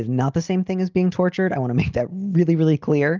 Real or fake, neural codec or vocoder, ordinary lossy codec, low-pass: real; none; Opus, 24 kbps; 7.2 kHz